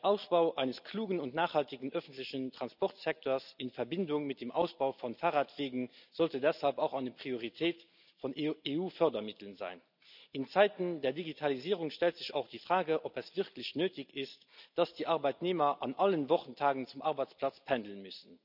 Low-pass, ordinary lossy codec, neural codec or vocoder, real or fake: 5.4 kHz; none; none; real